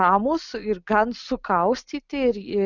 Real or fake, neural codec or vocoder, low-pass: real; none; 7.2 kHz